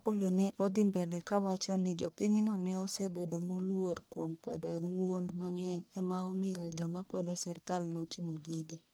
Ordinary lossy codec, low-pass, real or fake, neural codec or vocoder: none; none; fake; codec, 44.1 kHz, 1.7 kbps, Pupu-Codec